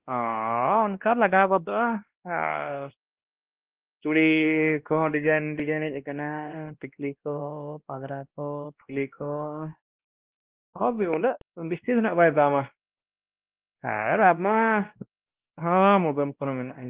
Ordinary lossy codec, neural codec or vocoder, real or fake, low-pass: Opus, 16 kbps; codec, 16 kHz, 1 kbps, X-Codec, WavLM features, trained on Multilingual LibriSpeech; fake; 3.6 kHz